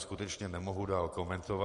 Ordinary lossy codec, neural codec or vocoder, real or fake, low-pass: MP3, 48 kbps; none; real; 14.4 kHz